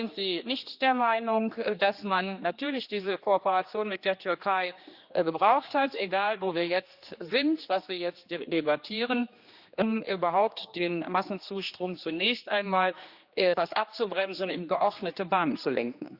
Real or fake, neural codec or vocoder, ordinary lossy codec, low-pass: fake; codec, 16 kHz, 2 kbps, X-Codec, HuBERT features, trained on general audio; Opus, 64 kbps; 5.4 kHz